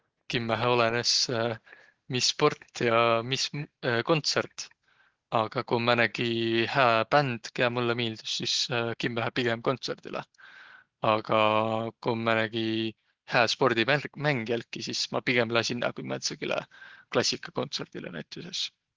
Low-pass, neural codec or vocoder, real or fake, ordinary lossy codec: 7.2 kHz; none; real; Opus, 16 kbps